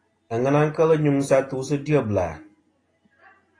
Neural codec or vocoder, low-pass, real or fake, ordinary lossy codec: none; 9.9 kHz; real; AAC, 32 kbps